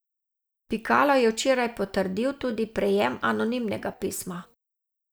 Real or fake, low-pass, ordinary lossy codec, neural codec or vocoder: real; none; none; none